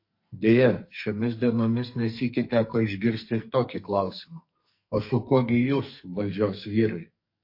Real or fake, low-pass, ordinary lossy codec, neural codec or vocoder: fake; 5.4 kHz; MP3, 32 kbps; codec, 44.1 kHz, 2.6 kbps, SNAC